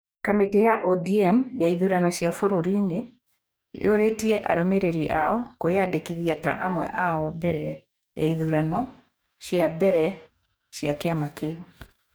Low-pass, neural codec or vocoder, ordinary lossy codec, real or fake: none; codec, 44.1 kHz, 2.6 kbps, DAC; none; fake